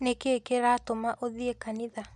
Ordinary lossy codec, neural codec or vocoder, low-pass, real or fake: none; none; none; real